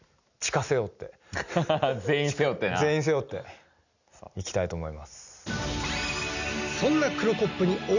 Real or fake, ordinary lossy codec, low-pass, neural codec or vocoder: real; none; 7.2 kHz; none